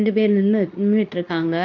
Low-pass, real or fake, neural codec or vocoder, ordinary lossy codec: 7.2 kHz; fake; codec, 24 kHz, 0.9 kbps, WavTokenizer, medium speech release version 2; none